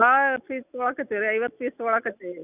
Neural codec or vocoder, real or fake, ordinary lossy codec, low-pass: none; real; none; 3.6 kHz